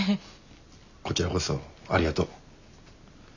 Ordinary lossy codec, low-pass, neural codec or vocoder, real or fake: none; 7.2 kHz; none; real